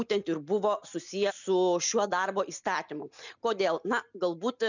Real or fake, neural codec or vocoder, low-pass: real; none; 7.2 kHz